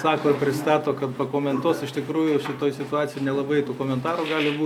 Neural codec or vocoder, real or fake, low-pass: none; real; 19.8 kHz